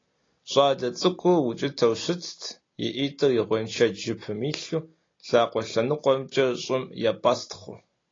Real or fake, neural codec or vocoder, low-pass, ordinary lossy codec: real; none; 7.2 kHz; AAC, 32 kbps